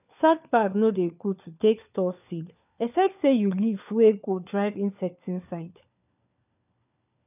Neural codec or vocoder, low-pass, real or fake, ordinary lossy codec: codec, 16 kHz, 4 kbps, FunCodec, trained on LibriTTS, 50 frames a second; 3.6 kHz; fake; none